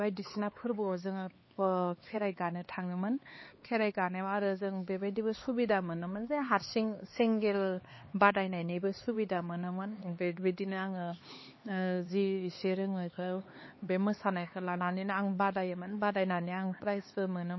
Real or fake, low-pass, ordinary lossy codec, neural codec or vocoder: fake; 7.2 kHz; MP3, 24 kbps; codec, 16 kHz, 4 kbps, X-Codec, HuBERT features, trained on LibriSpeech